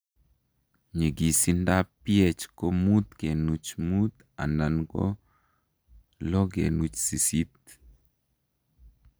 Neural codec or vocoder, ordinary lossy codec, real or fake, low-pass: none; none; real; none